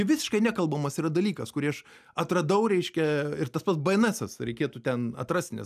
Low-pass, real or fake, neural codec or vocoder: 14.4 kHz; real; none